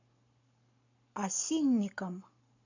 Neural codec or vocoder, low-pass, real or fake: codec, 44.1 kHz, 7.8 kbps, Pupu-Codec; 7.2 kHz; fake